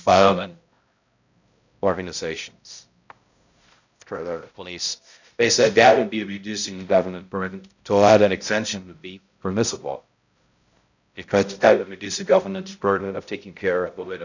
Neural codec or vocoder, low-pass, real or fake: codec, 16 kHz, 0.5 kbps, X-Codec, HuBERT features, trained on balanced general audio; 7.2 kHz; fake